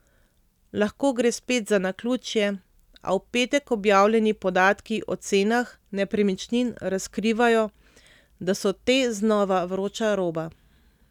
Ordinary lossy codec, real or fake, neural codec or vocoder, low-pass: none; real; none; 19.8 kHz